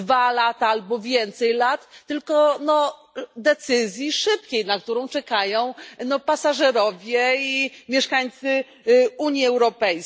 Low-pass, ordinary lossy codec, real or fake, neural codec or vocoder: none; none; real; none